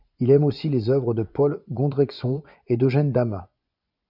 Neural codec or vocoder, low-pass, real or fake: vocoder, 24 kHz, 100 mel bands, Vocos; 5.4 kHz; fake